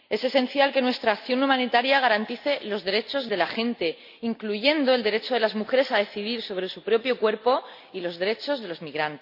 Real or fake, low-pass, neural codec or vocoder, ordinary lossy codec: real; 5.4 kHz; none; AAC, 48 kbps